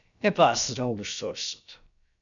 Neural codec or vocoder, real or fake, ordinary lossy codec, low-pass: codec, 16 kHz, about 1 kbps, DyCAST, with the encoder's durations; fake; MP3, 96 kbps; 7.2 kHz